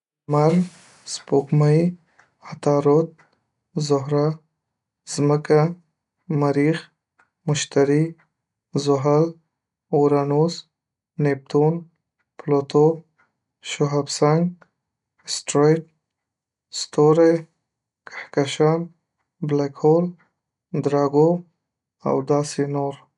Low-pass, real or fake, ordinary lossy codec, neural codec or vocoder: 10.8 kHz; real; none; none